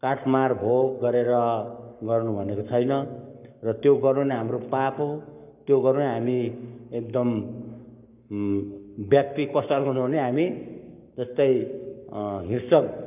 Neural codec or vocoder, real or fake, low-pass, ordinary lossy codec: codec, 44.1 kHz, 7.8 kbps, Pupu-Codec; fake; 3.6 kHz; none